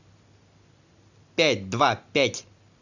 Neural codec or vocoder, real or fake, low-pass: none; real; 7.2 kHz